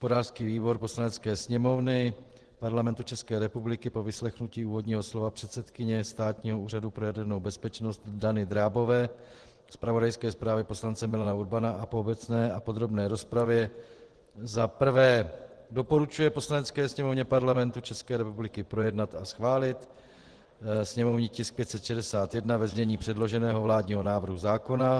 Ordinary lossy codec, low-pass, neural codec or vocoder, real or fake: Opus, 16 kbps; 10.8 kHz; vocoder, 24 kHz, 100 mel bands, Vocos; fake